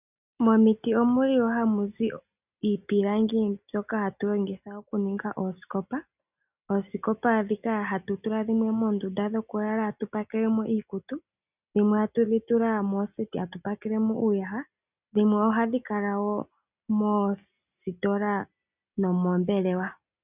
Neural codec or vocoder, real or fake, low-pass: none; real; 3.6 kHz